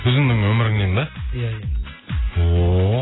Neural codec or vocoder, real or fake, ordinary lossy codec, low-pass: none; real; AAC, 16 kbps; 7.2 kHz